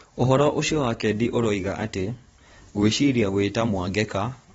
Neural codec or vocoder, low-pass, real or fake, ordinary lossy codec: vocoder, 44.1 kHz, 128 mel bands every 512 samples, BigVGAN v2; 19.8 kHz; fake; AAC, 24 kbps